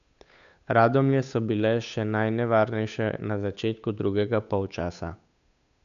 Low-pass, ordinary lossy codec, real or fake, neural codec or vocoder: 7.2 kHz; none; fake; codec, 16 kHz, 8 kbps, FunCodec, trained on Chinese and English, 25 frames a second